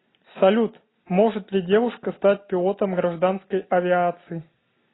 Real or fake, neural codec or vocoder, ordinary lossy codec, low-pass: real; none; AAC, 16 kbps; 7.2 kHz